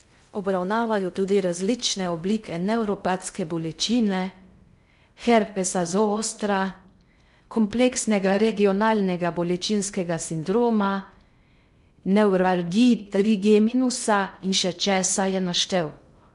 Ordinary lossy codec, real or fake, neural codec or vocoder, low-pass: MP3, 64 kbps; fake; codec, 16 kHz in and 24 kHz out, 0.6 kbps, FocalCodec, streaming, 2048 codes; 10.8 kHz